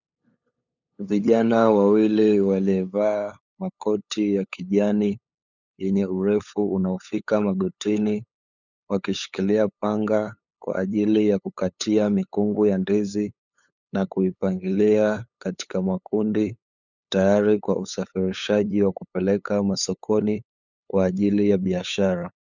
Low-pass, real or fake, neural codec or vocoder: 7.2 kHz; fake; codec, 16 kHz, 8 kbps, FunCodec, trained on LibriTTS, 25 frames a second